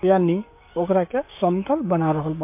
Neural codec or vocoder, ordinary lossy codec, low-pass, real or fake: codec, 16 kHz, 6 kbps, DAC; MP3, 24 kbps; 3.6 kHz; fake